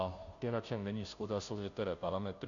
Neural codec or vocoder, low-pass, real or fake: codec, 16 kHz, 0.5 kbps, FunCodec, trained on Chinese and English, 25 frames a second; 7.2 kHz; fake